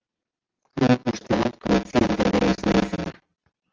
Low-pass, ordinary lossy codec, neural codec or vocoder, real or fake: 7.2 kHz; Opus, 24 kbps; none; real